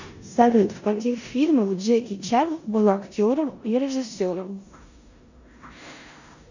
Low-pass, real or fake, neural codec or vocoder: 7.2 kHz; fake; codec, 16 kHz in and 24 kHz out, 0.9 kbps, LongCat-Audio-Codec, four codebook decoder